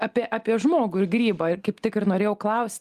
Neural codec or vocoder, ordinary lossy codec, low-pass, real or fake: none; Opus, 32 kbps; 14.4 kHz; real